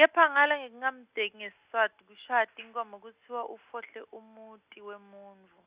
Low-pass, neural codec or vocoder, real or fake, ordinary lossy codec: 3.6 kHz; none; real; Opus, 64 kbps